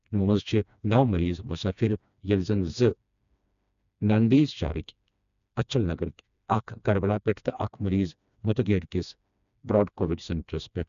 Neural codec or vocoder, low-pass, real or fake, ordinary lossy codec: codec, 16 kHz, 2 kbps, FreqCodec, smaller model; 7.2 kHz; fake; none